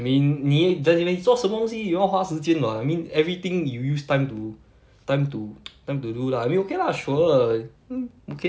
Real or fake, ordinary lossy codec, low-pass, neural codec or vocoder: real; none; none; none